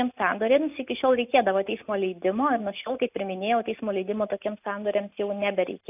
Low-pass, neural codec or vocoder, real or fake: 3.6 kHz; none; real